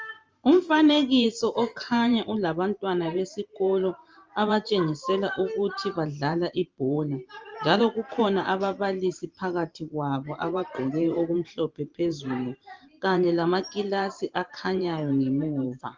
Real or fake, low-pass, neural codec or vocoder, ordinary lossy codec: fake; 7.2 kHz; vocoder, 44.1 kHz, 128 mel bands every 512 samples, BigVGAN v2; Opus, 32 kbps